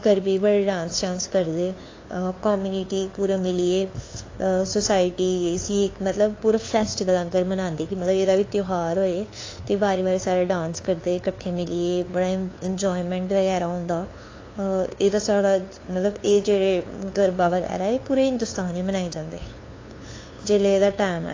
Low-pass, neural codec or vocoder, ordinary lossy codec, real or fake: 7.2 kHz; codec, 16 kHz, 2 kbps, FunCodec, trained on LibriTTS, 25 frames a second; AAC, 32 kbps; fake